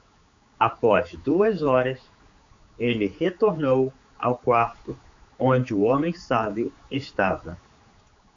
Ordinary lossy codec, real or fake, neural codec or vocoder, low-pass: AAC, 64 kbps; fake; codec, 16 kHz, 4 kbps, X-Codec, HuBERT features, trained on general audio; 7.2 kHz